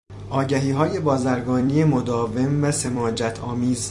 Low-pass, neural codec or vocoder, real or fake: 10.8 kHz; vocoder, 44.1 kHz, 128 mel bands every 256 samples, BigVGAN v2; fake